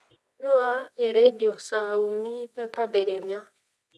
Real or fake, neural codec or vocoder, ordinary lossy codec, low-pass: fake; codec, 24 kHz, 0.9 kbps, WavTokenizer, medium music audio release; none; none